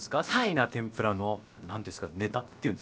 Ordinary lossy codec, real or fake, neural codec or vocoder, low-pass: none; fake; codec, 16 kHz, about 1 kbps, DyCAST, with the encoder's durations; none